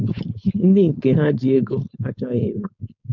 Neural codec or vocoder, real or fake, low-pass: codec, 16 kHz, 4.8 kbps, FACodec; fake; 7.2 kHz